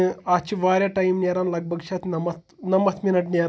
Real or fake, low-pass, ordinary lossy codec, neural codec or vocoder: real; none; none; none